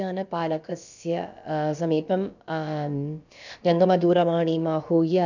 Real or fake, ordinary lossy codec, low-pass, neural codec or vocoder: fake; none; 7.2 kHz; codec, 16 kHz, about 1 kbps, DyCAST, with the encoder's durations